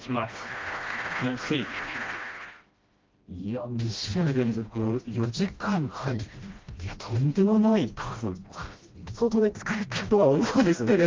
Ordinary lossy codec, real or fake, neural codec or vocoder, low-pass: Opus, 32 kbps; fake; codec, 16 kHz, 1 kbps, FreqCodec, smaller model; 7.2 kHz